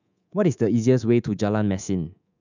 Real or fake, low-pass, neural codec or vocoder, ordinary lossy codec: fake; 7.2 kHz; codec, 24 kHz, 3.1 kbps, DualCodec; none